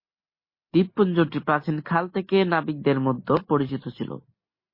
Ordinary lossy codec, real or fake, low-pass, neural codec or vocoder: MP3, 24 kbps; real; 5.4 kHz; none